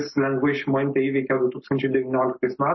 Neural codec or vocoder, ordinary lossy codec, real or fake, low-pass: none; MP3, 24 kbps; real; 7.2 kHz